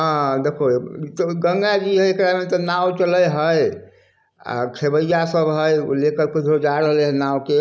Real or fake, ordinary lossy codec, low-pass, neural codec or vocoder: real; none; none; none